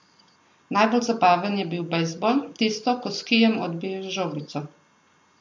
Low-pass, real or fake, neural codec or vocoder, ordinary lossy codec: 7.2 kHz; real; none; MP3, 48 kbps